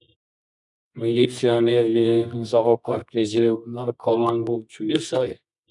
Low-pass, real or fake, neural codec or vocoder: 10.8 kHz; fake; codec, 24 kHz, 0.9 kbps, WavTokenizer, medium music audio release